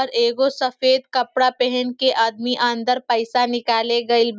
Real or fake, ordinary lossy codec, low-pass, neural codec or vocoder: real; none; none; none